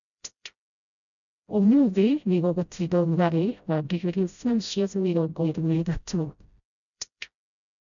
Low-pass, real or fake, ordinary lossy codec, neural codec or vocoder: 7.2 kHz; fake; none; codec, 16 kHz, 0.5 kbps, FreqCodec, smaller model